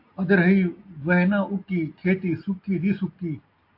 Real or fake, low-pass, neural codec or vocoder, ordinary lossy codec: real; 5.4 kHz; none; AAC, 32 kbps